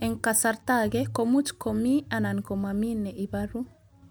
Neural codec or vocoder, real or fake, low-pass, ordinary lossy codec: none; real; none; none